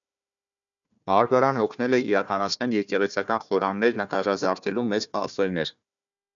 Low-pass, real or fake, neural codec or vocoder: 7.2 kHz; fake; codec, 16 kHz, 1 kbps, FunCodec, trained on Chinese and English, 50 frames a second